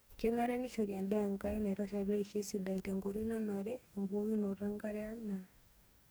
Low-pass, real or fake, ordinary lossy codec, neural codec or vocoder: none; fake; none; codec, 44.1 kHz, 2.6 kbps, DAC